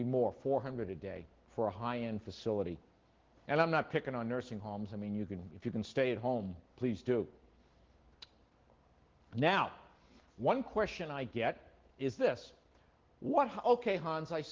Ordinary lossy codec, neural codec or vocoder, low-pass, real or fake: Opus, 16 kbps; none; 7.2 kHz; real